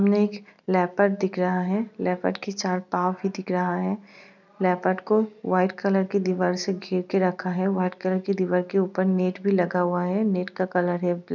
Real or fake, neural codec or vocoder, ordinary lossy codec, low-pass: real; none; none; 7.2 kHz